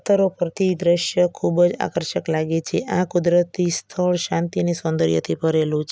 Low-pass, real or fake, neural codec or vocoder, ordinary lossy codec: none; real; none; none